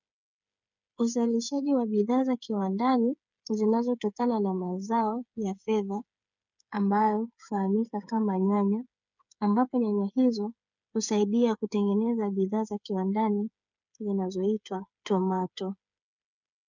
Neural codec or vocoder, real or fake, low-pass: codec, 16 kHz, 8 kbps, FreqCodec, smaller model; fake; 7.2 kHz